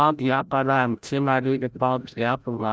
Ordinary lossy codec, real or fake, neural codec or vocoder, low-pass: none; fake; codec, 16 kHz, 0.5 kbps, FreqCodec, larger model; none